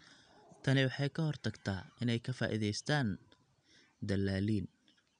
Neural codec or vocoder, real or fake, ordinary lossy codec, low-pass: none; real; none; 9.9 kHz